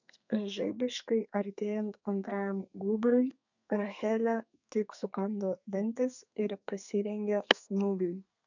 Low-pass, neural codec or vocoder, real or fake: 7.2 kHz; codec, 32 kHz, 1.9 kbps, SNAC; fake